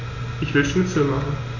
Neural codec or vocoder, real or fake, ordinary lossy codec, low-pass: none; real; none; 7.2 kHz